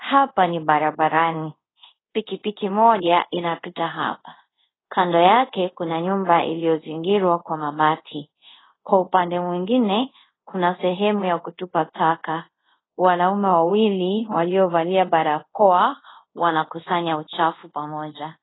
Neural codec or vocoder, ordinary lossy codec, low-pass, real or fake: codec, 24 kHz, 0.5 kbps, DualCodec; AAC, 16 kbps; 7.2 kHz; fake